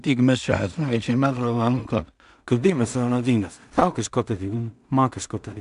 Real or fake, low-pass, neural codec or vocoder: fake; 10.8 kHz; codec, 16 kHz in and 24 kHz out, 0.4 kbps, LongCat-Audio-Codec, two codebook decoder